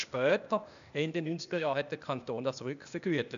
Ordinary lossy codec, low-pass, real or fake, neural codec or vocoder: none; 7.2 kHz; fake; codec, 16 kHz, 0.8 kbps, ZipCodec